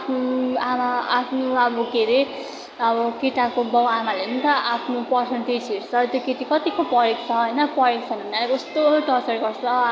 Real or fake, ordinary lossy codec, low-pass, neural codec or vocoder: real; none; none; none